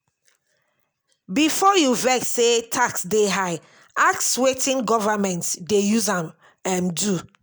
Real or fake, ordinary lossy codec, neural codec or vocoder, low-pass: real; none; none; none